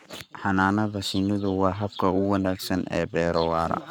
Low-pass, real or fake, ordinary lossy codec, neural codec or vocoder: 19.8 kHz; fake; none; codec, 44.1 kHz, 7.8 kbps, Pupu-Codec